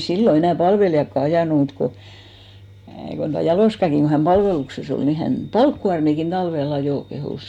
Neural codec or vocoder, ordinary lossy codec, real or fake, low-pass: none; none; real; 19.8 kHz